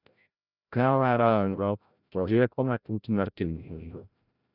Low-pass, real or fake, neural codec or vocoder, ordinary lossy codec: 5.4 kHz; fake; codec, 16 kHz, 0.5 kbps, FreqCodec, larger model; none